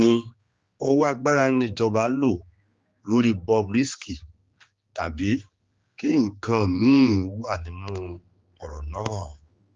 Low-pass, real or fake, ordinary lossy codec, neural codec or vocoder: 7.2 kHz; fake; Opus, 32 kbps; codec, 16 kHz, 2 kbps, X-Codec, HuBERT features, trained on general audio